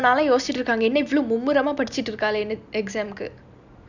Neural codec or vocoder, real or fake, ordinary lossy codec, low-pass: none; real; none; 7.2 kHz